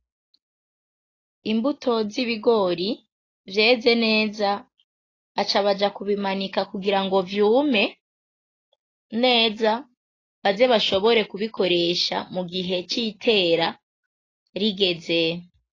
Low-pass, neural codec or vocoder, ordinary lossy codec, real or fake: 7.2 kHz; none; AAC, 32 kbps; real